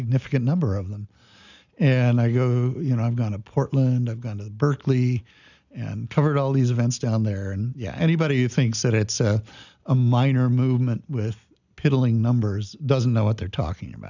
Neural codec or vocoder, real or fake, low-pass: none; real; 7.2 kHz